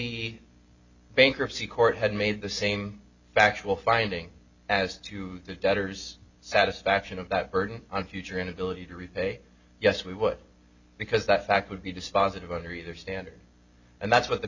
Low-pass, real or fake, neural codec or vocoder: 7.2 kHz; real; none